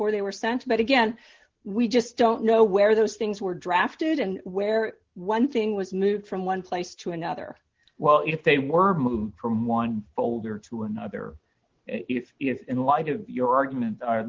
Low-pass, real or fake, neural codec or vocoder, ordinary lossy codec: 7.2 kHz; real; none; Opus, 16 kbps